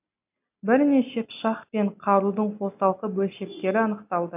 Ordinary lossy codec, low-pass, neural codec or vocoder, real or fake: AAC, 32 kbps; 3.6 kHz; none; real